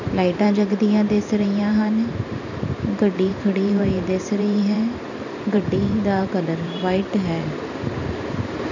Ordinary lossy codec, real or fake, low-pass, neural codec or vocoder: none; fake; 7.2 kHz; vocoder, 44.1 kHz, 128 mel bands every 512 samples, BigVGAN v2